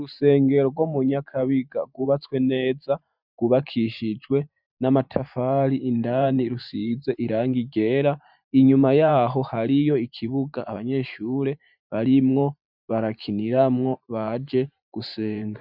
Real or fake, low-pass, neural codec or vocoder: fake; 5.4 kHz; vocoder, 44.1 kHz, 128 mel bands every 512 samples, BigVGAN v2